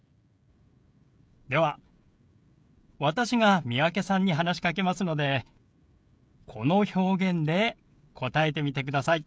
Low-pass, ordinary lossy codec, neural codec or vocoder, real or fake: none; none; codec, 16 kHz, 16 kbps, FreqCodec, smaller model; fake